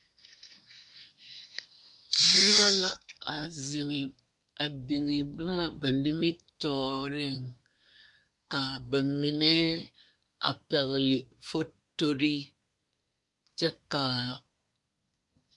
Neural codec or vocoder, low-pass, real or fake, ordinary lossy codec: codec, 24 kHz, 1 kbps, SNAC; 10.8 kHz; fake; MP3, 64 kbps